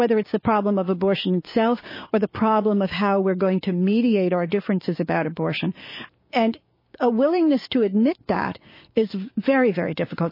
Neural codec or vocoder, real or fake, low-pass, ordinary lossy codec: codec, 16 kHz, 6 kbps, DAC; fake; 5.4 kHz; MP3, 24 kbps